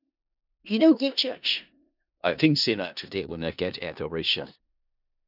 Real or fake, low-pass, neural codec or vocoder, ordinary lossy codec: fake; 5.4 kHz; codec, 16 kHz in and 24 kHz out, 0.4 kbps, LongCat-Audio-Codec, four codebook decoder; none